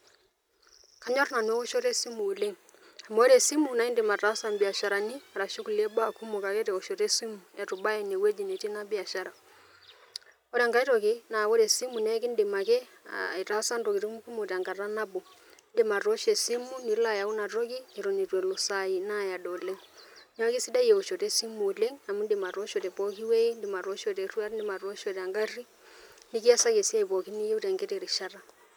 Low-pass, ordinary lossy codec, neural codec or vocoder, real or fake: none; none; none; real